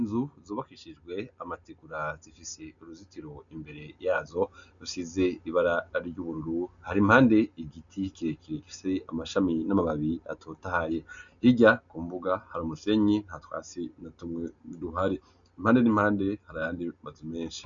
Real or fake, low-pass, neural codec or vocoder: real; 7.2 kHz; none